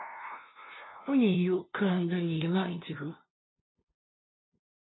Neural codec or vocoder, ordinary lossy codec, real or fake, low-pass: codec, 16 kHz, 0.5 kbps, FunCodec, trained on LibriTTS, 25 frames a second; AAC, 16 kbps; fake; 7.2 kHz